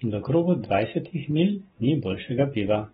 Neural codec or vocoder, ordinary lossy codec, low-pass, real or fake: none; AAC, 16 kbps; 7.2 kHz; real